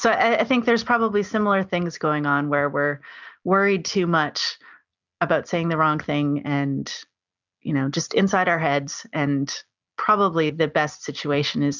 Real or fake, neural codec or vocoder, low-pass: real; none; 7.2 kHz